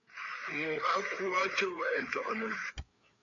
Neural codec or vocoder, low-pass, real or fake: codec, 16 kHz, 4 kbps, FreqCodec, larger model; 7.2 kHz; fake